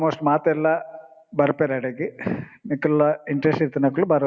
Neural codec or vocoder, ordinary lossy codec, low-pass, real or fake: none; none; none; real